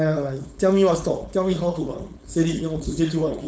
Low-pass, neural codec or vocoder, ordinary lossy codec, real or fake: none; codec, 16 kHz, 4.8 kbps, FACodec; none; fake